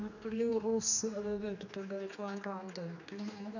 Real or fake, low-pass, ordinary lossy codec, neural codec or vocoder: fake; 7.2 kHz; none; codec, 16 kHz, 2 kbps, X-Codec, HuBERT features, trained on general audio